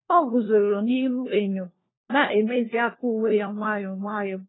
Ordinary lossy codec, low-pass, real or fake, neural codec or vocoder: AAC, 16 kbps; 7.2 kHz; fake; codec, 16 kHz, 1 kbps, FunCodec, trained on LibriTTS, 50 frames a second